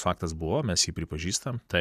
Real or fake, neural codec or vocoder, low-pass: real; none; 14.4 kHz